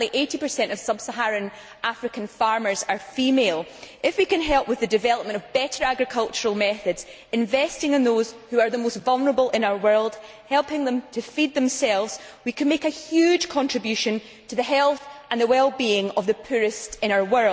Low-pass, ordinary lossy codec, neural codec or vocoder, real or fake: none; none; none; real